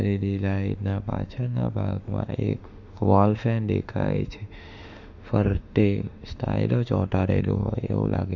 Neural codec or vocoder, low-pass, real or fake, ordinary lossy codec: autoencoder, 48 kHz, 32 numbers a frame, DAC-VAE, trained on Japanese speech; 7.2 kHz; fake; Opus, 64 kbps